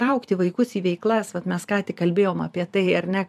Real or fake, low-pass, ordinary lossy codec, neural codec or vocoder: fake; 14.4 kHz; MP3, 96 kbps; vocoder, 44.1 kHz, 128 mel bands every 512 samples, BigVGAN v2